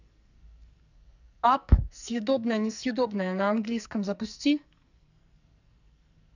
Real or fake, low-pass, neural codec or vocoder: fake; 7.2 kHz; codec, 44.1 kHz, 2.6 kbps, SNAC